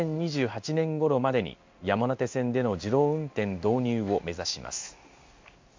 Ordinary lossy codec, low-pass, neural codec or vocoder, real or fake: MP3, 64 kbps; 7.2 kHz; codec, 16 kHz in and 24 kHz out, 1 kbps, XY-Tokenizer; fake